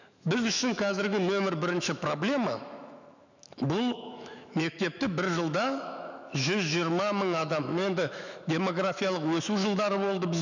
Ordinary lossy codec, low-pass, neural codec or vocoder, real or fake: none; 7.2 kHz; autoencoder, 48 kHz, 128 numbers a frame, DAC-VAE, trained on Japanese speech; fake